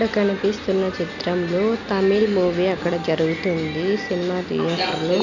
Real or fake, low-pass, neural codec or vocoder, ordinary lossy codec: real; 7.2 kHz; none; none